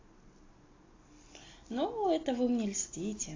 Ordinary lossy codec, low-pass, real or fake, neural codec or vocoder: AAC, 32 kbps; 7.2 kHz; real; none